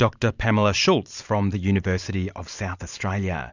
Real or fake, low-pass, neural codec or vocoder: fake; 7.2 kHz; vocoder, 44.1 kHz, 128 mel bands every 512 samples, BigVGAN v2